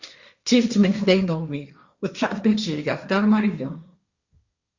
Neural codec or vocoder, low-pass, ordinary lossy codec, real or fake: codec, 16 kHz, 1.1 kbps, Voila-Tokenizer; 7.2 kHz; Opus, 64 kbps; fake